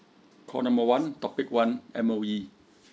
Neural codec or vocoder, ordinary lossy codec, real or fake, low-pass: none; none; real; none